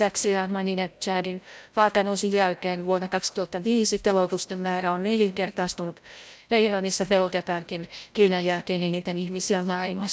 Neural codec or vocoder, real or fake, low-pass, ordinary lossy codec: codec, 16 kHz, 0.5 kbps, FreqCodec, larger model; fake; none; none